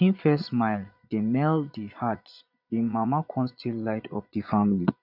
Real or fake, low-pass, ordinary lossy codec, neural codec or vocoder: fake; 5.4 kHz; none; vocoder, 22.05 kHz, 80 mel bands, Vocos